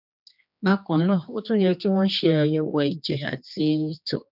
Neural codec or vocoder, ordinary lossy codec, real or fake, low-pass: codec, 16 kHz, 2 kbps, X-Codec, HuBERT features, trained on general audio; none; fake; 5.4 kHz